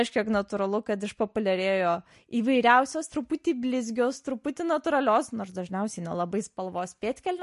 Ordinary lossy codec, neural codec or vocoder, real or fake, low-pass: MP3, 48 kbps; none; real; 14.4 kHz